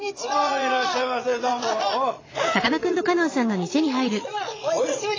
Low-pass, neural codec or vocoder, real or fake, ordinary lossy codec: 7.2 kHz; vocoder, 22.05 kHz, 80 mel bands, Vocos; fake; AAC, 32 kbps